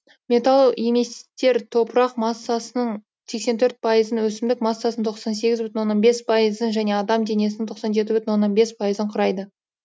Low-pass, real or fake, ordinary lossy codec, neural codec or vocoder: none; real; none; none